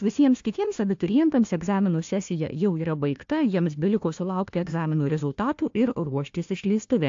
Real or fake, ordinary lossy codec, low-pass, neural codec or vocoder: fake; AAC, 48 kbps; 7.2 kHz; codec, 16 kHz, 1 kbps, FunCodec, trained on Chinese and English, 50 frames a second